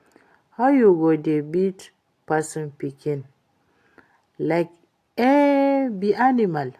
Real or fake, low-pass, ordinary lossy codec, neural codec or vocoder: real; 14.4 kHz; AAC, 64 kbps; none